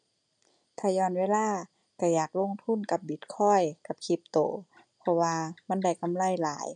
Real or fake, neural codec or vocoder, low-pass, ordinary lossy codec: real; none; 9.9 kHz; none